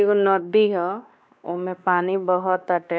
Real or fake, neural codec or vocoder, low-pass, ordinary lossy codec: fake; codec, 16 kHz, 2 kbps, X-Codec, WavLM features, trained on Multilingual LibriSpeech; none; none